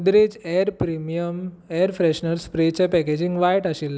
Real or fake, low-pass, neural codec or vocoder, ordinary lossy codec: real; none; none; none